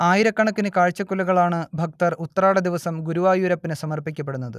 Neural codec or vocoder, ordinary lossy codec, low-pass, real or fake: none; none; 14.4 kHz; real